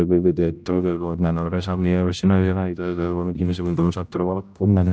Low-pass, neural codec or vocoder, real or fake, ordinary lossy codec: none; codec, 16 kHz, 0.5 kbps, X-Codec, HuBERT features, trained on general audio; fake; none